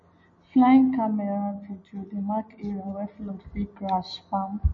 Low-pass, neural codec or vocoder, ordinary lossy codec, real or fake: 7.2 kHz; none; MP3, 32 kbps; real